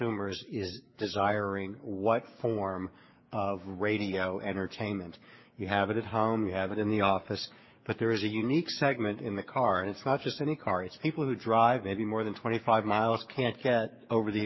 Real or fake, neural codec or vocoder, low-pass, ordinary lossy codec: fake; codec, 16 kHz, 6 kbps, DAC; 7.2 kHz; MP3, 24 kbps